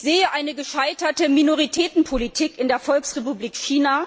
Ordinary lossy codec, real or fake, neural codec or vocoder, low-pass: none; real; none; none